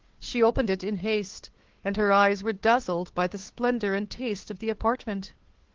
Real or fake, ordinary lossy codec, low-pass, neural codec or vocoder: fake; Opus, 16 kbps; 7.2 kHz; codec, 16 kHz, 2 kbps, FunCodec, trained on Chinese and English, 25 frames a second